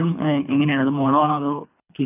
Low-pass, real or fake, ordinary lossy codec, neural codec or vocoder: 3.6 kHz; fake; none; codec, 24 kHz, 3 kbps, HILCodec